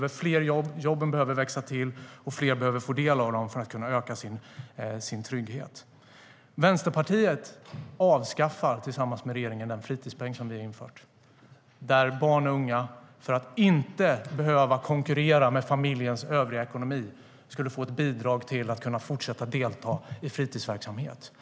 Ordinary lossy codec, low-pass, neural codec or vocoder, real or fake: none; none; none; real